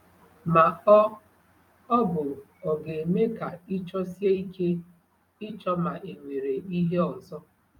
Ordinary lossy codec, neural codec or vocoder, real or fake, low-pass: none; vocoder, 44.1 kHz, 128 mel bands every 256 samples, BigVGAN v2; fake; 19.8 kHz